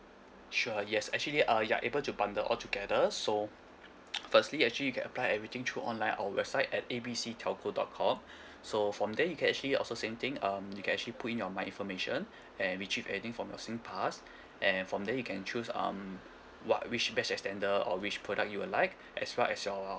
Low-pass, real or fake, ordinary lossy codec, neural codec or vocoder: none; real; none; none